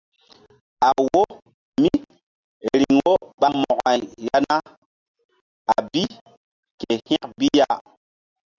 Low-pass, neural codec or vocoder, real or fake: 7.2 kHz; none; real